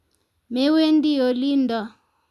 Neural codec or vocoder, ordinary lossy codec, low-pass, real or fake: none; none; none; real